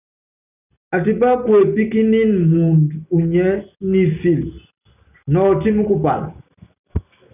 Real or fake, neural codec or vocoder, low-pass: real; none; 3.6 kHz